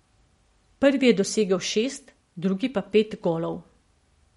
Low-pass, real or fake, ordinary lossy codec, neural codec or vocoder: 19.8 kHz; real; MP3, 48 kbps; none